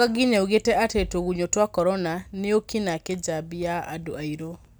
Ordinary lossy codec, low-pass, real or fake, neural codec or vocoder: none; none; real; none